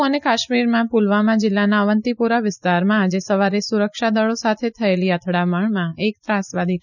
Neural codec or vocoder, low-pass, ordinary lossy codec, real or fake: none; 7.2 kHz; none; real